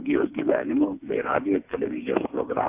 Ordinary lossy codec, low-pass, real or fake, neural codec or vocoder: AAC, 24 kbps; 3.6 kHz; fake; codec, 16 kHz, 4 kbps, FreqCodec, smaller model